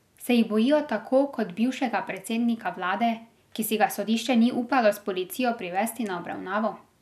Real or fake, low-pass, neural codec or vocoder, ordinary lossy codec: real; 14.4 kHz; none; none